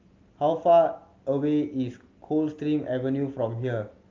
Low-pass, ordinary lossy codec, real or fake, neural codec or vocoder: 7.2 kHz; Opus, 32 kbps; real; none